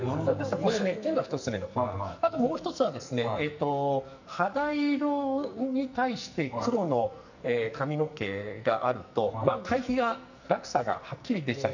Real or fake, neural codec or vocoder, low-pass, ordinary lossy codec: fake; codec, 44.1 kHz, 2.6 kbps, SNAC; 7.2 kHz; none